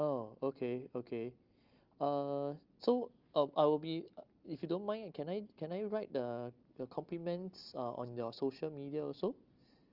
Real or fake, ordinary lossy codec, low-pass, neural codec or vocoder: real; Opus, 24 kbps; 5.4 kHz; none